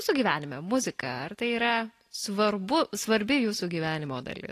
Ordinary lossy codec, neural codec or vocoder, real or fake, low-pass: AAC, 48 kbps; none; real; 14.4 kHz